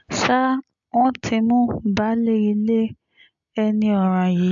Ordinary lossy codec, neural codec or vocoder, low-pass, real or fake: MP3, 64 kbps; none; 7.2 kHz; real